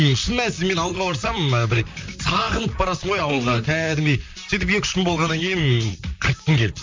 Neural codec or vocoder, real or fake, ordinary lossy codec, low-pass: vocoder, 44.1 kHz, 128 mel bands, Pupu-Vocoder; fake; MP3, 64 kbps; 7.2 kHz